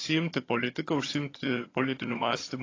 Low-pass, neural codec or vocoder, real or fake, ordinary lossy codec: 7.2 kHz; vocoder, 22.05 kHz, 80 mel bands, HiFi-GAN; fake; AAC, 32 kbps